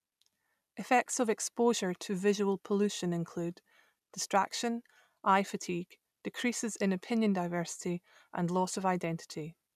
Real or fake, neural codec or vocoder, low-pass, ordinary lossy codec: real; none; 14.4 kHz; none